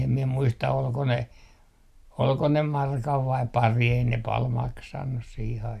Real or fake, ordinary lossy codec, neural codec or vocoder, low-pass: real; none; none; 14.4 kHz